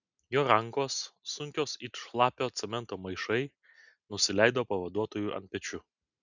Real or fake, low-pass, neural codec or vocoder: real; 7.2 kHz; none